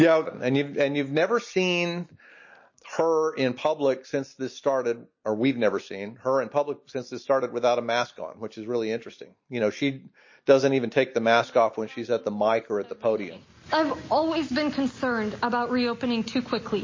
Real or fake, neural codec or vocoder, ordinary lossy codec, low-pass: real; none; MP3, 32 kbps; 7.2 kHz